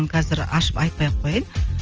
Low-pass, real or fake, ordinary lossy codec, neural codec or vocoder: 7.2 kHz; real; Opus, 24 kbps; none